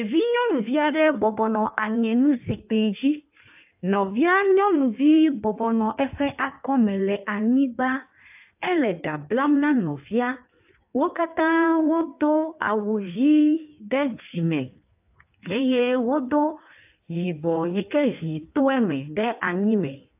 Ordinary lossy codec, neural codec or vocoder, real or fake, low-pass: AAC, 32 kbps; codec, 16 kHz in and 24 kHz out, 1.1 kbps, FireRedTTS-2 codec; fake; 3.6 kHz